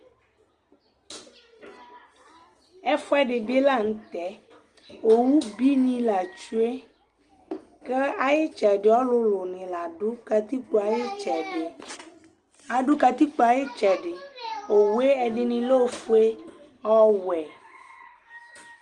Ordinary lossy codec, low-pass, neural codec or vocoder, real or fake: Opus, 24 kbps; 10.8 kHz; none; real